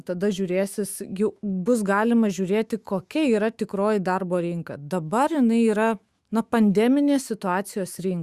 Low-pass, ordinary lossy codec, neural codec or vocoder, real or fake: 14.4 kHz; Opus, 64 kbps; autoencoder, 48 kHz, 128 numbers a frame, DAC-VAE, trained on Japanese speech; fake